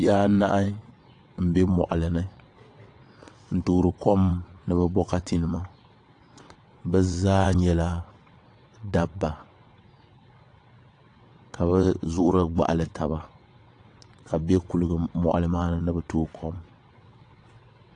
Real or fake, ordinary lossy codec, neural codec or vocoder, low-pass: fake; AAC, 48 kbps; vocoder, 22.05 kHz, 80 mel bands, WaveNeXt; 9.9 kHz